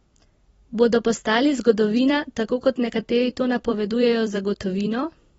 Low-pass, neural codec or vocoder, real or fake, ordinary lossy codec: 19.8 kHz; vocoder, 44.1 kHz, 128 mel bands every 256 samples, BigVGAN v2; fake; AAC, 24 kbps